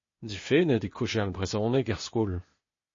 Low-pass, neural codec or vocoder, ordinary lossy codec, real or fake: 7.2 kHz; codec, 16 kHz, 0.8 kbps, ZipCodec; MP3, 32 kbps; fake